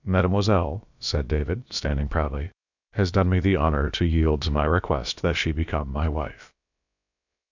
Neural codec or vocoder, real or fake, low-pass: codec, 16 kHz, about 1 kbps, DyCAST, with the encoder's durations; fake; 7.2 kHz